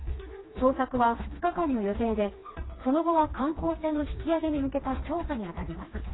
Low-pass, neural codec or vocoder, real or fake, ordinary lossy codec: 7.2 kHz; codec, 16 kHz, 2 kbps, FreqCodec, smaller model; fake; AAC, 16 kbps